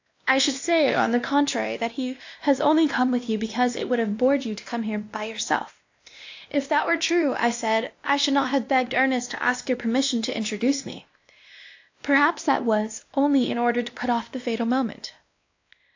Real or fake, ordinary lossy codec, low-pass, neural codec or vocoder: fake; AAC, 48 kbps; 7.2 kHz; codec, 16 kHz, 1 kbps, X-Codec, WavLM features, trained on Multilingual LibriSpeech